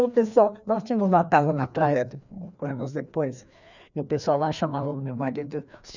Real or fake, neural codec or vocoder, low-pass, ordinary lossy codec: fake; codec, 16 kHz, 2 kbps, FreqCodec, larger model; 7.2 kHz; none